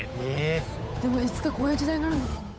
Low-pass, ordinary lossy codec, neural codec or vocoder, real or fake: none; none; codec, 16 kHz, 8 kbps, FunCodec, trained on Chinese and English, 25 frames a second; fake